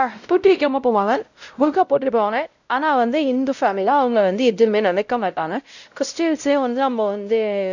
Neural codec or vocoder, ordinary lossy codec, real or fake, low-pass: codec, 16 kHz, 0.5 kbps, X-Codec, WavLM features, trained on Multilingual LibriSpeech; none; fake; 7.2 kHz